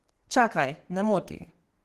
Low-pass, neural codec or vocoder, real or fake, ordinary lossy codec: 14.4 kHz; codec, 32 kHz, 1.9 kbps, SNAC; fake; Opus, 16 kbps